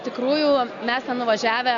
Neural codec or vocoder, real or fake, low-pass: none; real; 7.2 kHz